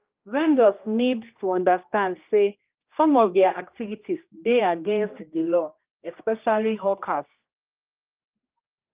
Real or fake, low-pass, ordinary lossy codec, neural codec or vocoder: fake; 3.6 kHz; Opus, 24 kbps; codec, 16 kHz, 1 kbps, X-Codec, HuBERT features, trained on general audio